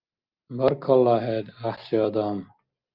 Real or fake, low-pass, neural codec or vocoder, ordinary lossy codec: real; 5.4 kHz; none; Opus, 32 kbps